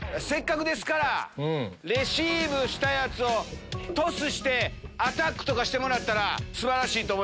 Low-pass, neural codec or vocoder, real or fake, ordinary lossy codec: none; none; real; none